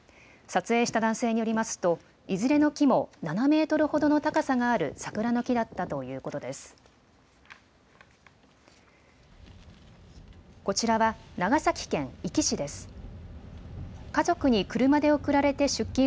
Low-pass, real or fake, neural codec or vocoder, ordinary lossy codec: none; real; none; none